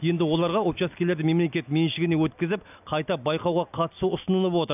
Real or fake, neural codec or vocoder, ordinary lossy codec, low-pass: real; none; none; 3.6 kHz